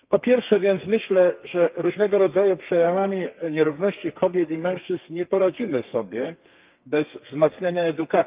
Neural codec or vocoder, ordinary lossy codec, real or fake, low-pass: codec, 32 kHz, 1.9 kbps, SNAC; Opus, 24 kbps; fake; 3.6 kHz